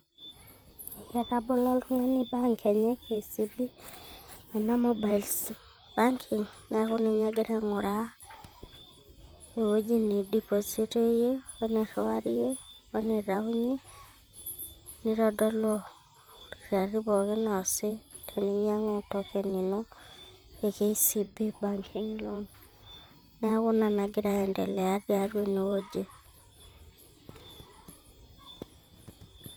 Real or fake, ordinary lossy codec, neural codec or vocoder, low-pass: fake; none; vocoder, 44.1 kHz, 128 mel bands, Pupu-Vocoder; none